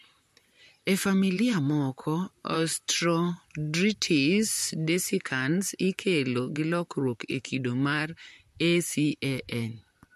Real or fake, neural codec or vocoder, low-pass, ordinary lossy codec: fake; vocoder, 44.1 kHz, 128 mel bands, Pupu-Vocoder; 14.4 kHz; MP3, 64 kbps